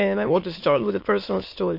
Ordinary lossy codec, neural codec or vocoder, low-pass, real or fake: MP3, 32 kbps; autoencoder, 22.05 kHz, a latent of 192 numbers a frame, VITS, trained on many speakers; 5.4 kHz; fake